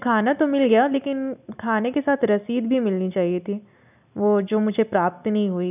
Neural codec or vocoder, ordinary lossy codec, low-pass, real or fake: none; none; 3.6 kHz; real